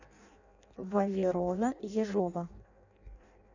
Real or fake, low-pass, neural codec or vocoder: fake; 7.2 kHz; codec, 16 kHz in and 24 kHz out, 0.6 kbps, FireRedTTS-2 codec